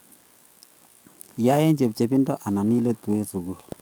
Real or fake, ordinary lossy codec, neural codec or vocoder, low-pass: fake; none; codec, 44.1 kHz, 7.8 kbps, DAC; none